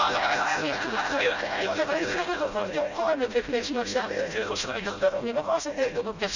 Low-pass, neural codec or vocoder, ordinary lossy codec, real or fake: 7.2 kHz; codec, 16 kHz, 0.5 kbps, FreqCodec, smaller model; none; fake